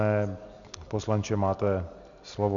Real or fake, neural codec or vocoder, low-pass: real; none; 7.2 kHz